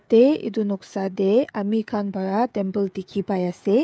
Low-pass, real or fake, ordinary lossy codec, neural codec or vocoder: none; fake; none; codec, 16 kHz, 16 kbps, FreqCodec, smaller model